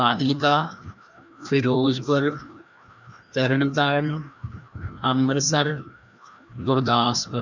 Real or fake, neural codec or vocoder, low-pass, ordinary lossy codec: fake; codec, 16 kHz, 1 kbps, FreqCodec, larger model; 7.2 kHz; none